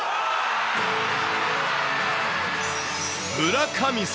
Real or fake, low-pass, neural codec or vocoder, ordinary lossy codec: real; none; none; none